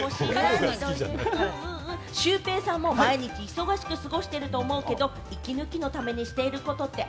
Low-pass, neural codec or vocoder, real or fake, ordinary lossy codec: none; none; real; none